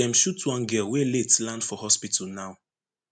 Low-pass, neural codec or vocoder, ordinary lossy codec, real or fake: 9.9 kHz; none; MP3, 96 kbps; real